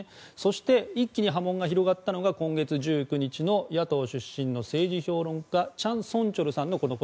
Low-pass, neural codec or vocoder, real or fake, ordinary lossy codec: none; none; real; none